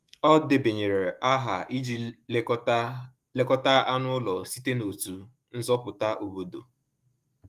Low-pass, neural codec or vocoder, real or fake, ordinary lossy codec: 14.4 kHz; autoencoder, 48 kHz, 128 numbers a frame, DAC-VAE, trained on Japanese speech; fake; Opus, 32 kbps